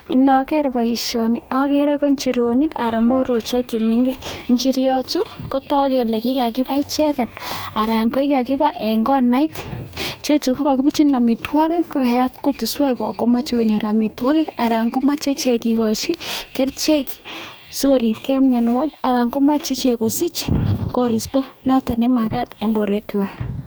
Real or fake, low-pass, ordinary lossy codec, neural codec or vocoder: fake; none; none; codec, 44.1 kHz, 2.6 kbps, DAC